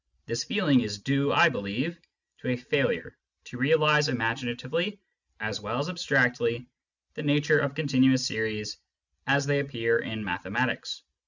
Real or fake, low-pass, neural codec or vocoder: real; 7.2 kHz; none